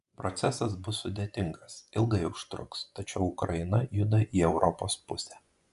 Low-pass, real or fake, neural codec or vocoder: 10.8 kHz; fake; vocoder, 24 kHz, 100 mel bands, Vocos